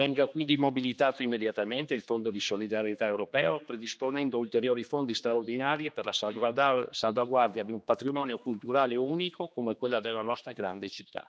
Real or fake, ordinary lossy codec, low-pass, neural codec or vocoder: fake; none; none; codec, 16 kHz, 2 kbps, X-Codec, HuBERT features, trained on general audio